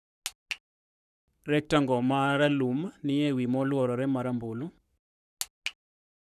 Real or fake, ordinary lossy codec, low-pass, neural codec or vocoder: fake; none; 14.4 kHz; codec, 44.1 kHz, 7.8 kbps, Pupu-Codec